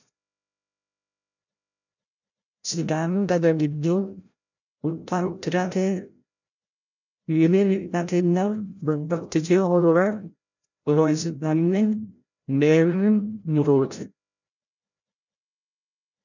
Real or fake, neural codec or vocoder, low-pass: fake; codec, 16 kHz, 0.5 kbps, FreqCodec, larger model; 7.2 kHz